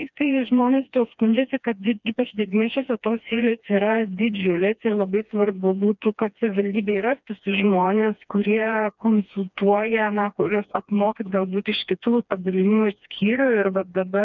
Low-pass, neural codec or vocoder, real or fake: 7.2 kHz; codec, 16 kHz, 2 kbps, FreqCodec, smaller model; fake